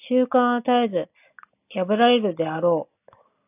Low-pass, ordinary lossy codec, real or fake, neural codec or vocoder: 3.6 kHz; AAC, 24 kbps; real; none